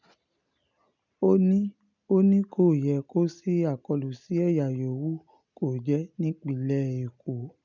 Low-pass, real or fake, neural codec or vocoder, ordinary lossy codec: 7.2 kHz; real; none; none